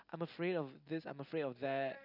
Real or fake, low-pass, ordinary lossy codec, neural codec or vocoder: real; 5.4 kHz; none; none